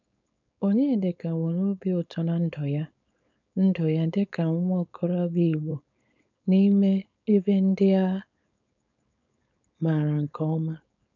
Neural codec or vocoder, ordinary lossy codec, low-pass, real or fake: codec, 16 kHz, 4.8 kbps, FACodec; none; 7.2 kHz; fake